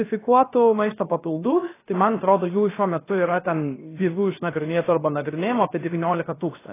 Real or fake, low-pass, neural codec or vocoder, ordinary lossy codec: fake; 3.6 kHz; codec, 16 kHz, 0.7 kbps, FocalCodec; AAC, 16 kbps